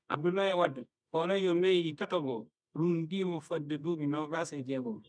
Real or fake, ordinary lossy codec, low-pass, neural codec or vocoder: fake; none; 9.9 kHz; codec, 24 kHz, 0.9 kbps, WavTokenizer, medium music audio release